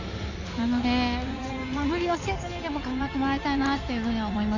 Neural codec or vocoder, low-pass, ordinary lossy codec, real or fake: codec, 16 kHz in and 24 kHz out, 2.2 kbps, FireRedTTS-2 codec; 7.2 kHz; none; fake